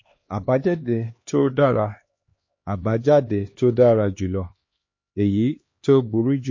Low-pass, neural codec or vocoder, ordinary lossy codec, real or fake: 7.2 kHz; codec, 16 kHz, 2 kbps, X-Codec, HuBERT features, trained on LibriSpeech; MP3, 32 kbps; fake